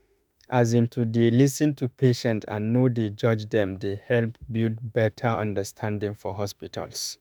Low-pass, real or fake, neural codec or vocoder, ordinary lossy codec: none; fake; autoencoder, 48 kHz, 32 numbers a frame, DAC-VAE, trained on Japanese speech; none